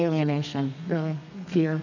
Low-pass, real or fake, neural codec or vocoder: 7.2 kHz; fake; codec, 32 kHz, 1.9 kbps, SNAC